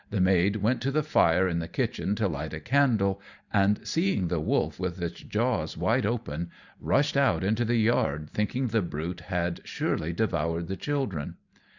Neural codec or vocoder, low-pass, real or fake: vocoder, 44.1 kHz, 128 mel bands every 512 samples, BigVGAN v2; 7.2 kHz; fake